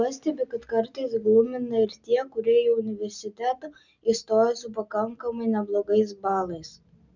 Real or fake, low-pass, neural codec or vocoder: real; 7.2 kHz; none